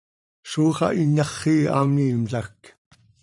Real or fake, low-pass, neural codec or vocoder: fake; 10.8 kHz; vocoder, 44.1 kHz, 128 mel bands every 512 samples, BigVGAN v2